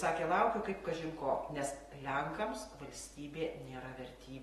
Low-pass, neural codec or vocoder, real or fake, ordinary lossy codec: 19.8 kHz; none; real; AAC, 32 kbps